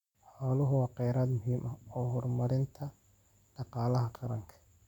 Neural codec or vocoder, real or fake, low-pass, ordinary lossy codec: none; real; 19.8 kHz; none